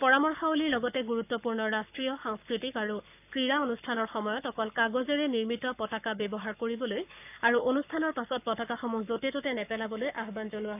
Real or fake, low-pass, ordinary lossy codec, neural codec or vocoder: fake; 3.6 kHz; none; codec, 44.1 kHz, 7.8 kbps, Pupu-Codec